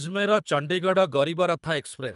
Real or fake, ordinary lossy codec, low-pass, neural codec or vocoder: fake; none; 10.8 kHz; codec, 24 kHz, 3 kbps, HILCodec